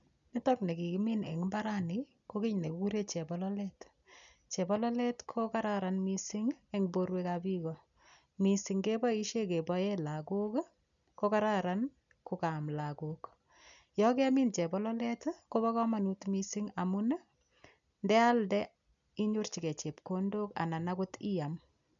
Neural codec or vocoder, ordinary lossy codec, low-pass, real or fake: none; none; 7.2 kHz; real